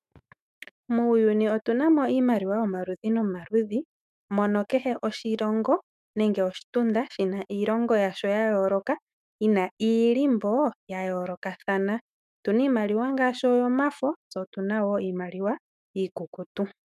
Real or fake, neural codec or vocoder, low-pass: fake; autoencoder, 48 kHz, 128 numbers a frame, DAC-VAE, trained on Japanese speech; 14.4 kHz